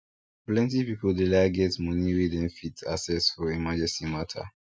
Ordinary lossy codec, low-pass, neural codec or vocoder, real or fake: none; none; none; real